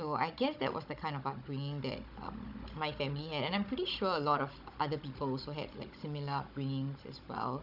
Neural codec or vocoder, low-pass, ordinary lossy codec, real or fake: codec, 16 kHz, 16 kbps, FunCodec, trained on Chinese and English, 50 frames a second; 5.4 kHz; none; fake